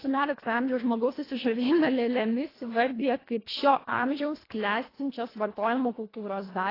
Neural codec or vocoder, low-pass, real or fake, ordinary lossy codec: codec, 24 kHz, 1.5 kbps, HILCodec; 5.4 kHz; fake; AAC, 24 kbps